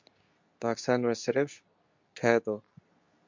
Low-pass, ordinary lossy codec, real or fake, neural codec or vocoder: 7.2 kHz; MP3, 64 kbps; fake; codec, 24 kHz, 0.9 kbps, WavTokenizer, medium speech release version 2